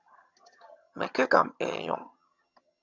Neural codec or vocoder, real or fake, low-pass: vocoder, 22.05 kHz, 80 mel bands, HiFi-GAN; fake; 7.2 kHz